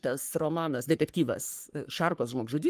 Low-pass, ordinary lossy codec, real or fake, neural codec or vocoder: 14.4 kHz; Opus, 32 kbps; fake; codec, 44.1 kHz, 3.4 kbps, Pupu-Codec